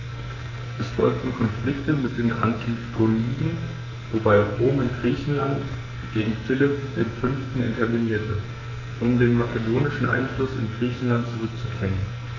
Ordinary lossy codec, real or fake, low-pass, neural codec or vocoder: none; fake; 7.2 kHz; codec, 44.1 kHz, 2.6 kbps, SNAC